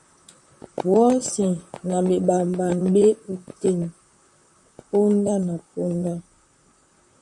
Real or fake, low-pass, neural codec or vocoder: fake; 10.8 kHz; vocoder, 44.1 kHz, 128 mel bands, Pupu-Vocoder